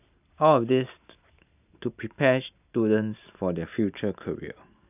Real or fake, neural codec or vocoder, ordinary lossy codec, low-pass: real; none; none; 3.6 kHz